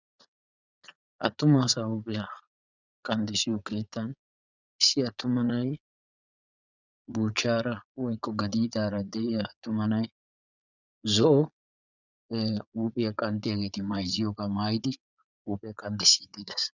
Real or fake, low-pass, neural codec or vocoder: fake; 7.2 kHz; vocoder, 22.05 kHz, 80 mel bands, Vocos